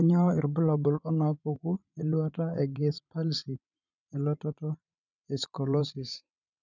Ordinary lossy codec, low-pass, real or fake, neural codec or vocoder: none; 7.2 kHz; fake; vocoder, 44.1 kHz, 80 mel bands, Vocos